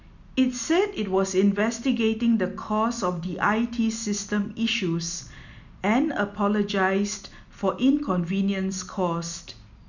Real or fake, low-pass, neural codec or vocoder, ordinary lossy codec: real; 7.2 kHz; none; none